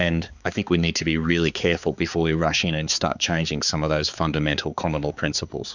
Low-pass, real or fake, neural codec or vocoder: 7.2 kHz; fake; codec, 16 kHz, 4 kbps, X-Codec, HuBERT features, trained on balanced general audio